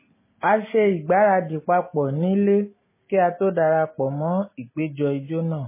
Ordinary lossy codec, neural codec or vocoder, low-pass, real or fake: MP3, 16 kbps; none; 3.6 kHz; real